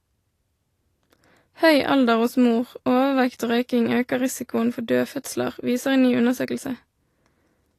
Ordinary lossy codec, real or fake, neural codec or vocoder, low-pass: AAC, 48 kbps; real; none; 14.4 kHz